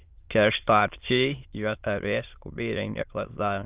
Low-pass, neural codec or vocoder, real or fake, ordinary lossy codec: 3.6 kHz; autoencoder, 22.05 kHz, a latent of 192 numbers a frame, VITS, trained on many speakers; fake; Opus, 32 kbps